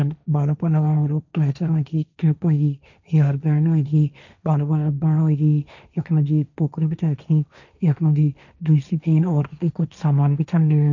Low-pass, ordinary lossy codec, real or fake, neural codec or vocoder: 7.2 kHz; none; fake; codec, 16 kHz, 1.1 kbps, Voila-Tokenizer